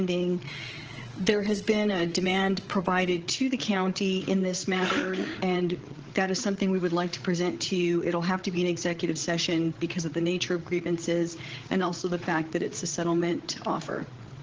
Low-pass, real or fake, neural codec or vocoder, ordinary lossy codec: 7.2 kHz; fake; codec, 16 kHz, 8 kbps, FreqCodec, larger model; Opus, 16 kbps